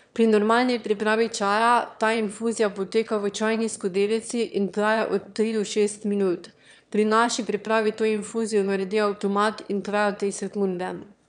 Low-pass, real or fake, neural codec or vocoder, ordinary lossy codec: 9.9 kHz; fake; autoencoder, 22.05 kHz, a latent of 192 numbers a frame, VITS, trained on one speaker; none